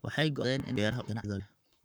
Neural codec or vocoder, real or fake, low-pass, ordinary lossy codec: codec, 44.1 kHz, 7.8 kbps, Pupu-Codec; fake; none; none